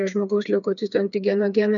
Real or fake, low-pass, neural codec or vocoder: fake; 7.2 kHz; codec, 16 kHz, 4 kbps, FreqCodec, smaller model